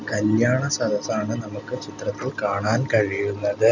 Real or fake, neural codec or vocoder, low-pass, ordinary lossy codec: real; none; 7.2 kHz; none